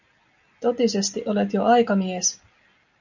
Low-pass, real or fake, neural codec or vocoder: 7.2 kHz; real; none